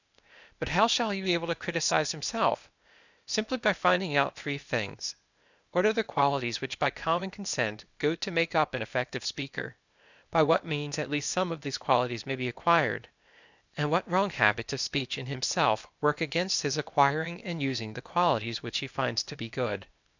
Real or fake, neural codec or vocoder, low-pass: fake; codec, 16 kHz, 0.8 kbps, ZipCodec; 7.2 kHz